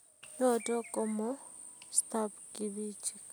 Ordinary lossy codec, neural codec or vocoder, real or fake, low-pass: none; none; real; none